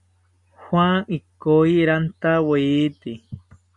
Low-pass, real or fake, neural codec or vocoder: 10.8 kHz; real; none